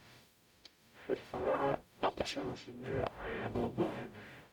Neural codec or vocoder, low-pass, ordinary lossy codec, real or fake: codec, 44.1 kHz, 0.9 kbps, DAC; 19.8 kHz; none; fake